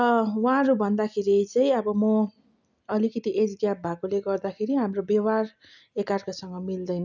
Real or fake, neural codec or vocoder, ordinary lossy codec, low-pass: real; none; none; 7.2 kHz